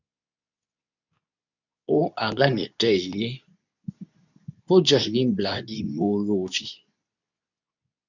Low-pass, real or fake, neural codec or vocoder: 7.2 kHz; fake; codec, 24 kHz, 0.9 kbps, WavTokenizer, medium speech release version 2